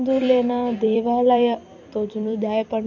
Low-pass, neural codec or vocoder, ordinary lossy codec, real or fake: 7.2 kHz; vocoder, 44.1 kHz, 128 mel bands every 256 samples, BigVGAN v2; none; fake